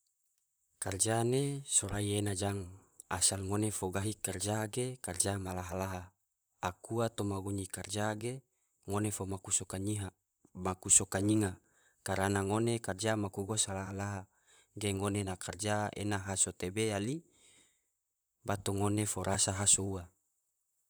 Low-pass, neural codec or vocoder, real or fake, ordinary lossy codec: none; vocoder, 44.1 kHz, 128 mel bands, Pupu-Vocoder; fake; none